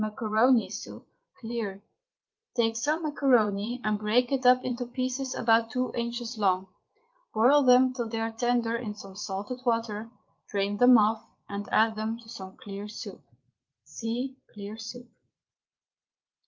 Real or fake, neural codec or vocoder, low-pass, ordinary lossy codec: fake; vocoder, 22.05 kHz, 80 mel bands, Vocos; 7.2 kHz; Opus, 32 kbps